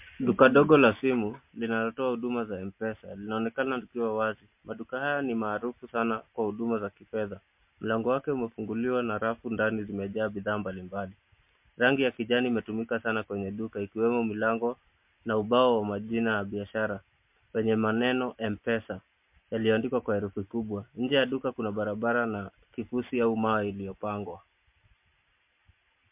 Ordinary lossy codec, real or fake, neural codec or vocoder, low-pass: MP3, 32 kbps; real; none; 3.6 kHz